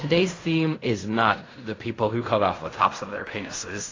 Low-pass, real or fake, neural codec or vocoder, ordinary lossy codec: 7.2 kHz; fake; codec, 16 kHz in and 24 kHz out, 0.4 kbps, LongCat-Audio-Codec, fine tuned four codebook decoder; AAC, 32 kbps